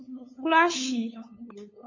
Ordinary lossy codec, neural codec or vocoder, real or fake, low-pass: MP3, 32 kbps; codec, 16 kHz, 8 kbps, FunCodec, trained on LibriTTS, 25 frames a second; fake; 7.2 kHz